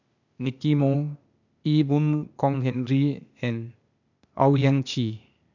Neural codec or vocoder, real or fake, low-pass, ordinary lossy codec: codec, 16 kHz, 0.8 kbps, ZipCodec; fake; 7.2 kHz; none